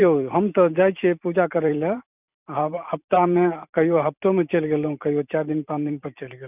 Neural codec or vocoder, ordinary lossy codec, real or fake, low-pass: vocoder, 44.1 kHz, 128 mel bands every 512 samples, BigVGAN v2; none; fake; 3.6 kHz